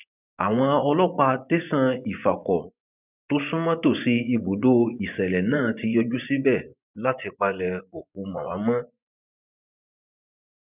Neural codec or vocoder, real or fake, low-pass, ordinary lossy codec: vocoder, 24 kHz, 100 mel bands, Vocos; fake; 3.6 kHz; none